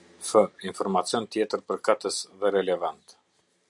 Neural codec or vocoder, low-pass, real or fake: none; 10.8 kHz; real